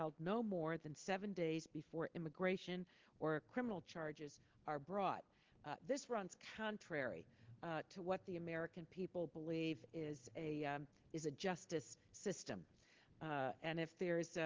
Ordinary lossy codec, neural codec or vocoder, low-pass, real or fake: Opus, 16 kbps; none; 7.2 kHz; real